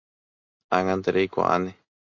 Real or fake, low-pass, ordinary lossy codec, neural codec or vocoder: real; 7.2 kHz; MP3, 48 kbps; none